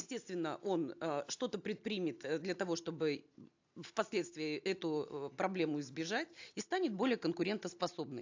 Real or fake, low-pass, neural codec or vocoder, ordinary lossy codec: real; 7.2 kHz; none; AAC, 48 kbps